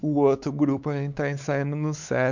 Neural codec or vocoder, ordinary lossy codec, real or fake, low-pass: codec, 16 kHz, 4 kbps, X-Codec, WavLM features, trained on Multilingual LibriSpeech; none; fake; 7.2 kHz